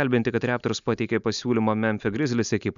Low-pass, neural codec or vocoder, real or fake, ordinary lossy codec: 7.2 kHz; none; real; MP3, 96 kbps